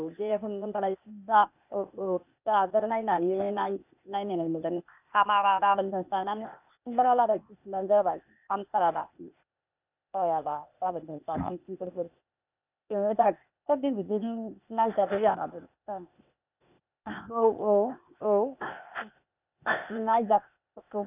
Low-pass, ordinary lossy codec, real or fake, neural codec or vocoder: 3.6 kHz; none; fake; codec, 16 kHz, 0.8 kbps, ZipCodec